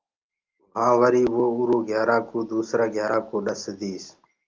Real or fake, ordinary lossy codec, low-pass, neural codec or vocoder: real; Opus, 32 kbps; 7.2 kHz; none